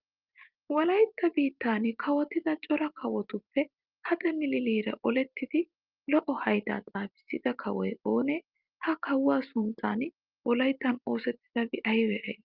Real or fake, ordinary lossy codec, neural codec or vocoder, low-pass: real; Opus, 32 kbps; none; 5.4 kHz